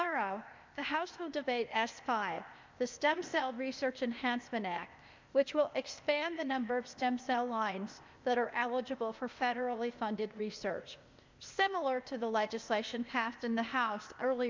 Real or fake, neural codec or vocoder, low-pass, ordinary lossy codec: fake; codec, 16 kHz, 0.8 kbps, ZipCodec; 7.2 kHz; MP3, 64 kbps